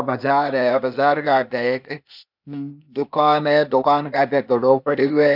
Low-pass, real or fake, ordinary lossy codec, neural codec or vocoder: 5.4 kHz; fake; none; codec, 16 kHz, 0.8 kbps, ZipCodec